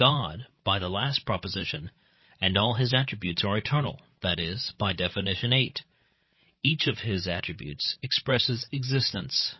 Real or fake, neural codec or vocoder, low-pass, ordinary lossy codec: fake; codec, 16 kHz, 16 kbps, FreqCodec, larger model; 7.2 kHz; MP3, 24 kbps